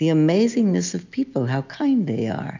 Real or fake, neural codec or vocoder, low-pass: real; none; 7.2 kHz